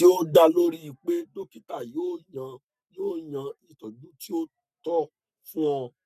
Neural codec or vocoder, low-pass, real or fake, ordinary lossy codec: codec, 44.1 kHz, 7.8 kbps, Pupu-Codec; 14.4 kHz; fake; none